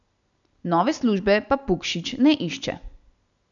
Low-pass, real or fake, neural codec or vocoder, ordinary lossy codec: 7.2 kHz; real; none; none